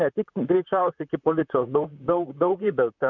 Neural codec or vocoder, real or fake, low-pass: vocoder, 44.1 kHz, 128 mel bands, Pupu-Vocoder; fake; 7.2 kHz